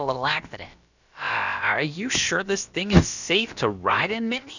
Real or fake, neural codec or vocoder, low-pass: fake; codec, 16 kHz, about 1 kbps, DyCAST, with the encoder's durations; 7.2 kHz